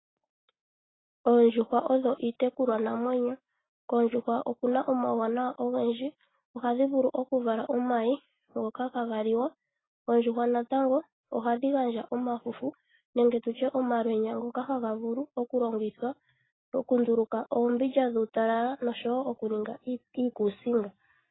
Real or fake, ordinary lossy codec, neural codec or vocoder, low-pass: real; AAC, 16 kbps; none; 7.2 kHz